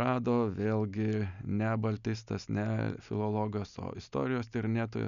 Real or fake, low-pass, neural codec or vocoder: real; 7.2 kHz; none